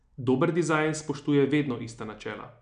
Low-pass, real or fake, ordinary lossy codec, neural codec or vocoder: 9.9 kHz; real; MP3, 96 kbps; none